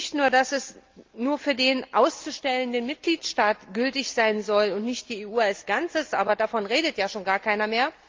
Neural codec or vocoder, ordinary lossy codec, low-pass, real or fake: none; Opus, 32 kbps; 7.2 kHz; real